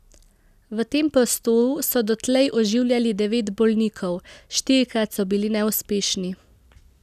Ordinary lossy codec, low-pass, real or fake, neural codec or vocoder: none; 14.4 kHz; real; none